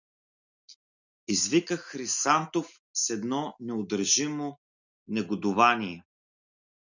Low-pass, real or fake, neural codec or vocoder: 7.2 kHz; real; none